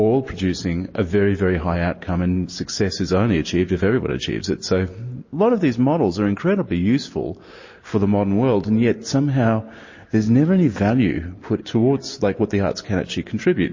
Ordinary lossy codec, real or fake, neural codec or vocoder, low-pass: MP3, 32 kbps; real; none; 7.2 kHz